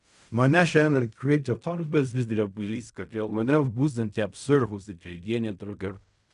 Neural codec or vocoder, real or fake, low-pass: codec, 16 kHz in and 24 kHz out, 0.4 kbps, LongCat-Audio-Codec, fine tuned four codebook decoder; fake; 10.8 kHz